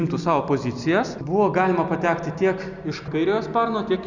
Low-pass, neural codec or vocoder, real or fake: 7.2 kHz; none; real